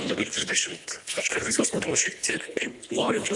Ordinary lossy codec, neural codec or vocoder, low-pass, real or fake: AAC, 64 kbps; codec, 24 kHz, 1.5 kbps, HILCodec; 10.8 kHz; fake